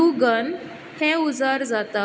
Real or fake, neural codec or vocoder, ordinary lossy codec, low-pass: real; none; none; none